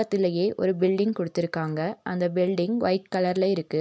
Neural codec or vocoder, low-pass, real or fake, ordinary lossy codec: none; none; real; none